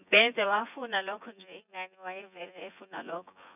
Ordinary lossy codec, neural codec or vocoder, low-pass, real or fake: none; vocoder, 24 kHz, 100 mel bands, Vocos; 3.6 kHz; fake